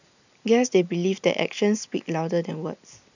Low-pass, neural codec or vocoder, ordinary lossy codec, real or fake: 7.2 kHz; none; none; real